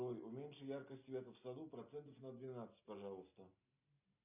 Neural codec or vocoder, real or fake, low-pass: none; real; 3.6 kHz